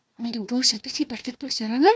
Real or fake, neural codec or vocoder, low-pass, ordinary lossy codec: fake; codec, 16 kHz, 1 kbps, FunCodec, trained on Chinese and English, 50 frames a second; none; none